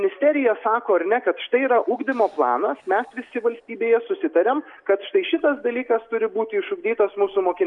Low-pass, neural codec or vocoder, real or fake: 10.8 kHz; none; real